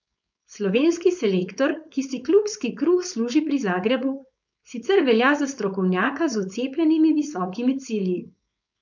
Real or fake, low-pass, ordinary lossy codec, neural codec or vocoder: fake; 7.2 kHz; none; codec, 16 kHz, 4.8 kbps, FACodec